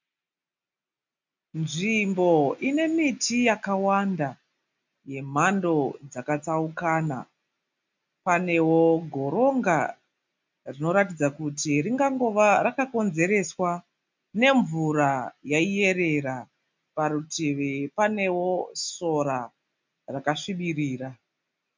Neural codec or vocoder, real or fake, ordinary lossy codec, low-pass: none; real; MP3, 64 kbps; 7.2 kHz